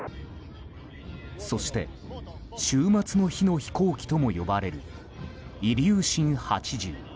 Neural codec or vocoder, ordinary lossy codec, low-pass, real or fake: none; none; none; real